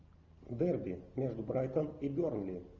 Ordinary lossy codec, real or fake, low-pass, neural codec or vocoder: Opus, 32 kbps; real; 7.2 kHz; none